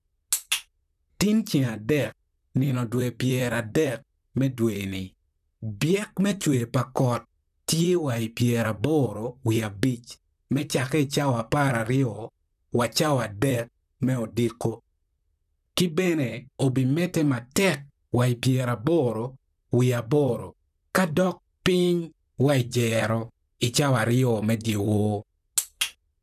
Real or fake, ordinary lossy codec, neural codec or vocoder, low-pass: fake; none; vocoder, 44.1 kHz, 128 mel bands, Pupu-Vocoder; 14.4 kHz